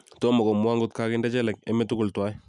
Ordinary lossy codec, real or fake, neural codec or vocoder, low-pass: none; real; none; 10.8 kHz